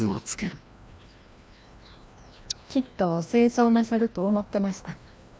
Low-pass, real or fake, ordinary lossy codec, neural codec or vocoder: none; fake; none; codec, 16 kHz, 1 kbps, FreqCodec, larger model